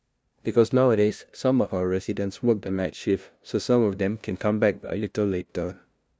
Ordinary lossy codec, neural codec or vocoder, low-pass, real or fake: none; codec, 16 kHz, 0.5 kbps, FunCodec, trained on LibriTTS, 25 frames a second; none; fake